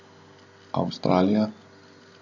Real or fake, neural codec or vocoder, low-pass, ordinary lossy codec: real; none; 7.2 kHz; AAC, 48 kbps